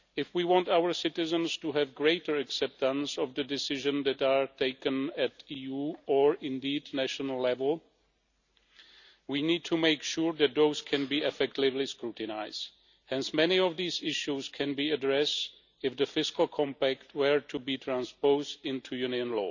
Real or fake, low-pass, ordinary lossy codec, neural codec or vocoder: real; 7.2 kHz; none; none